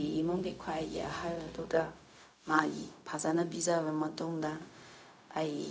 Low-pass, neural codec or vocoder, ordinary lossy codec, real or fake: none; codec, 16 kHz, 0.4 kbps, LongCat-Audio-Codec; none; fake